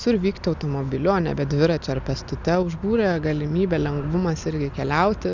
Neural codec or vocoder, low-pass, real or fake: none; 7.2 kHz; real